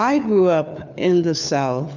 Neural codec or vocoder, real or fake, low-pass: codec, 16 kHz, 4 kbps, FunCodec, trained on LibriTTS, 50 frames a second; fake; 7.2 kHz